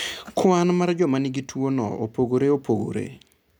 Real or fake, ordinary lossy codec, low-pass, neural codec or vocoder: real; none; none; none